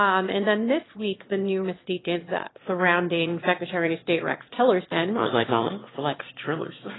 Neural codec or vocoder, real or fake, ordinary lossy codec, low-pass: autoencoder, 22.05 kHz, a latent of 192 numbers a frame, VITS, trained on one speaker; fake; AAC, 16 kbps; 7.2 kHz